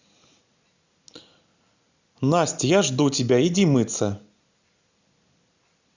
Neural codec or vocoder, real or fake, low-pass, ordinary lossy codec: none; real; 7.2 kHz; Opus, 64 kbps